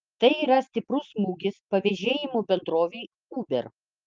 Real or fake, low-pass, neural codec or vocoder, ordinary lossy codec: real; 7.2 kHz; none; Opus, 32 kbps